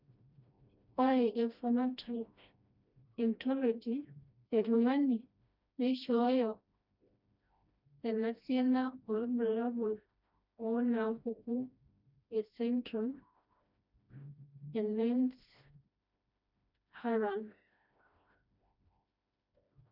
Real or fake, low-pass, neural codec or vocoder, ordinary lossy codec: fake; 5.4 kHz; codec, 16 kHz, 1 kbps, FreqCodec, smaller model; none